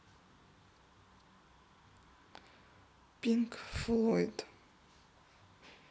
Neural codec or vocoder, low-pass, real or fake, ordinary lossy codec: none; none; real; none